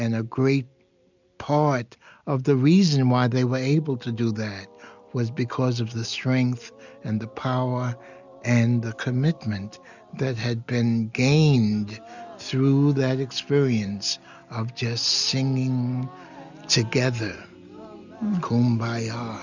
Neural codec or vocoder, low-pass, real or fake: none; 7.2 kHz; real